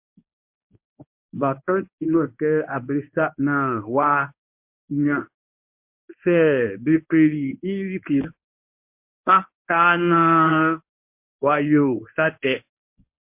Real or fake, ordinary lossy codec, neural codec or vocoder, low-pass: fake; MP3, 32 kbps; codec, 24 kHz, 0.9 kbps, WavTokenizer, medium speech release version 1; 3.6 kHz